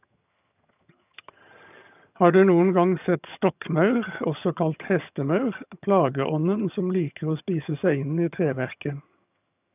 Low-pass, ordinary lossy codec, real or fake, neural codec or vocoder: 3.6 kHz; none; fake; vocoder, 22.05 kHz, 80 mel bands, HiFi-GAN